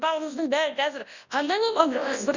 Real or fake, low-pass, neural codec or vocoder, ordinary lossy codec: fake; 7.2 kHz; codec, 24 kHz, 0.9 kbps, WavTokenizer, large speech release; Opus, 64 kbps